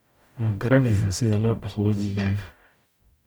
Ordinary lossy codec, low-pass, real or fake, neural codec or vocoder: none; none; fake; codec, 44.1 kHz, 0.9 kbps, DAC